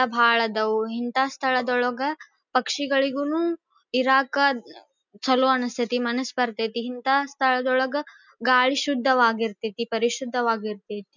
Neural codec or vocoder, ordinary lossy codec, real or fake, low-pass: none; none; real; 7.2 kHz